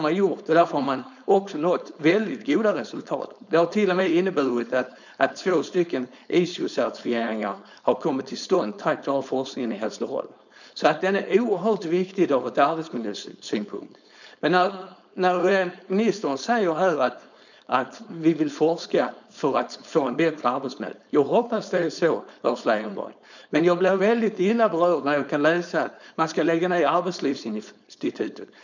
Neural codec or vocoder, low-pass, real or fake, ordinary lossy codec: codec, 16 kHz, 4.8 kbps, FACodec; 7.2 kHz; fake; none